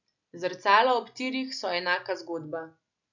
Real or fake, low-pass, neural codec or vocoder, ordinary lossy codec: real; 7.2 kHz; none; none